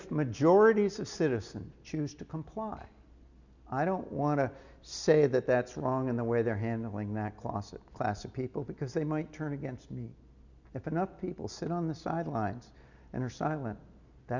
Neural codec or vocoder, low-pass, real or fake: none; 7.2 kHz; real